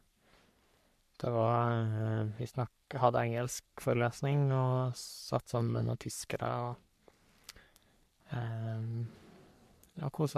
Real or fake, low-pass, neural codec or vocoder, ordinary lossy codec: fake; 14.4 kHz; codec, 44.1 kHz, 3.4 kbps, Pupu-Codec; MP3, 96 kbps